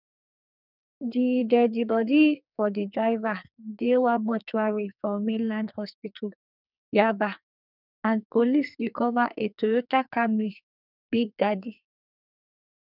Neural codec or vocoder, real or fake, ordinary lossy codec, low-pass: codec, 44.1 kHz, 2.6 kbps, SNAC; fake; none; 5.4 kHz